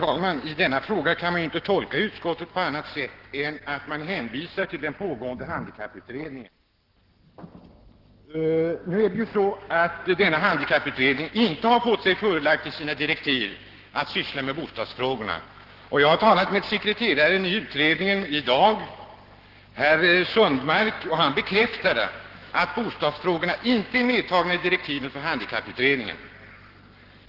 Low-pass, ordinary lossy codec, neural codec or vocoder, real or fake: 5.4 kHz; Opus, 16 kbps; codec, 44.1 kHz, 7.8 kbps, Pupu-Codec; fake